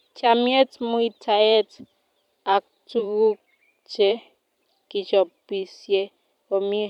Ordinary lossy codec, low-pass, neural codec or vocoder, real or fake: none; 19.8 kHz; vocoder, 44.1 kHz, 128 mel bands every 512 samples, BigVGAN v2; fake